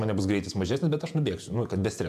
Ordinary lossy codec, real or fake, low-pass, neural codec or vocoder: AAC, 96 kbps; fake; 14.4 kHz; vocoder, 48 kHz, 128 mel bands, Vocos